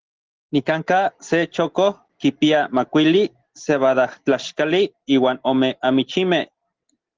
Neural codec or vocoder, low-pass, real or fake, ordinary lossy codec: none; 7.2 kHz; real; Opus, 16 kbps